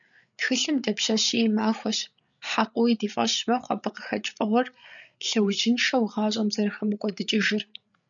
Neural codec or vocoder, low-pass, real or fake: codec, 16 kHz, 8 kbps, FreqCodec, larger model; 7.2 kHz; fake